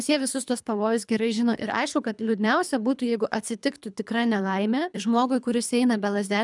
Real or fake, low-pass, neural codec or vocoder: fake; 10.8 kHz; codec, 24 kHz, 3 kbps, HILCodec